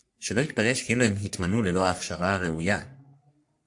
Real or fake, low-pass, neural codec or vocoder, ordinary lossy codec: fake; 10.8 kHz; codec, 44.1 kHz, 3.4 kbps, Pupu-Codec; AAC, 64 kbps